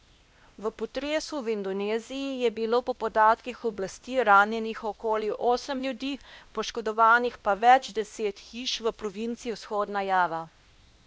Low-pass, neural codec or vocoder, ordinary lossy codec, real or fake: none; codec, 16 kHz, 1 kbps, X-Codec, WavLM features, trained on Multilingual LibriSpeech; none; fake